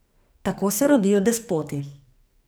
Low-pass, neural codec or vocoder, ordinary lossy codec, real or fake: none; codec, 44.1 kHz, 2.6 kbps, SNAC; none; fake